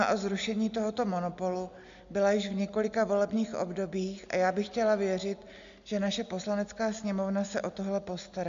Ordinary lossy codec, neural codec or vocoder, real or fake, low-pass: MP3, 64 kbps; none; real; 7.2 kHz